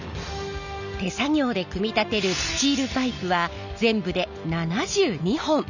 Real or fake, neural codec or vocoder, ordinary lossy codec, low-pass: real; none; none; 7.2 kHz